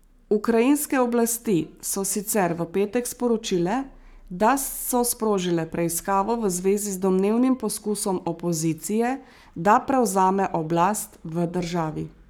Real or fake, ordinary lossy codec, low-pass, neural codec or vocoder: fake; none; none; codec, 44.1 kHz, 7.8 kbps, Pupu-Codec